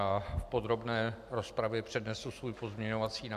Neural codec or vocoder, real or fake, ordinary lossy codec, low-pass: none; real; AAC, 96 kbps; 14.4 kHz